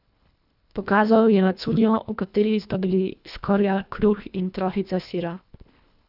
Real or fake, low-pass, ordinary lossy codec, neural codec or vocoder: fake; 5.4 kHz; none; codec, 24 kHz, 1.5 kbps, HILCodec